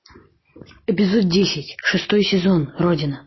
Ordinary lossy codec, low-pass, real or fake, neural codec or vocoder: MP3, 24 kbps; 7.2 kHz; real; none